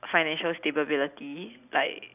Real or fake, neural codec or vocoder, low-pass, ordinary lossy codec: real; none; 3.6 kHz; none